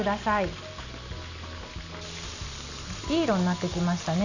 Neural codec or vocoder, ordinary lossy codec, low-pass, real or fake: none; none; 7.2 kHz; real